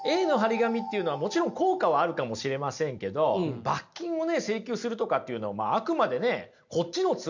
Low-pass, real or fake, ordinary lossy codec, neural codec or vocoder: 7.2 kHz; real; none; none